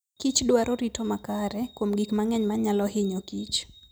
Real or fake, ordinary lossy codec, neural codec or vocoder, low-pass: real; none; none; none